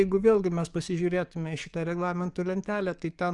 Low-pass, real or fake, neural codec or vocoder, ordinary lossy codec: 10.8 kHz; fake; codec, 44.1 kHz, 7.8 kbps, DAC; Opus, 64 kbps